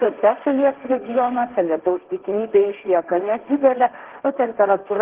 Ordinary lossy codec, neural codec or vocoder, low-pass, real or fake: Opus, 16 kbps; codec, 16 kHz, 1.1 kbps, Voila-Tokenizer; 3.6 kHz; fake